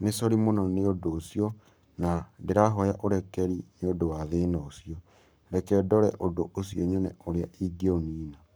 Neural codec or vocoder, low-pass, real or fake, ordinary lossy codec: codec, 44.1 kHz, 7.8 kbps, Pupu-Codec; none; fake; none